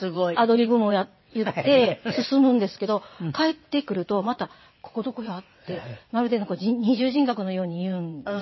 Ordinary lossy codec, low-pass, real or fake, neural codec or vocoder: MP3, 24 kbps; 7.2 kHz; fake; vocoder, 22.05 kHz, 80 mel bands, WaveNeXt